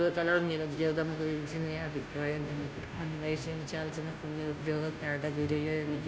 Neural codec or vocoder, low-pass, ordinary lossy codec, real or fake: codec, 16 kHz, 0.5 kbps, FunCodec, trained on Chinese and English, 25 frames a second; none; none; fake